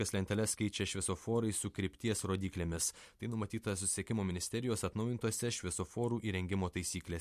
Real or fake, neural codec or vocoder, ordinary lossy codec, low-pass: real; none; MP3, 64 kbps; 14.4 kHz